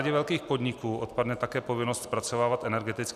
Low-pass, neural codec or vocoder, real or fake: 14.4 kHz; none; real